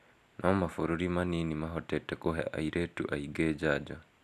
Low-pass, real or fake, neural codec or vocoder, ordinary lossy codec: 14.4 kHz; real; none; none